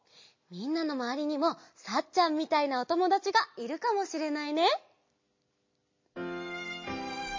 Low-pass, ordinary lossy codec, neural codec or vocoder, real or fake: 7.2 kHz; MP3, 32 kbps; none; real